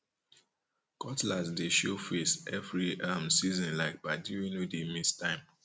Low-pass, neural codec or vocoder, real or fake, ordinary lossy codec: none; none; real; none